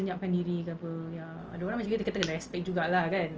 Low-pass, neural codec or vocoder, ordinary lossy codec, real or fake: 7.2 kHz; none; Opus, 24 kbps; real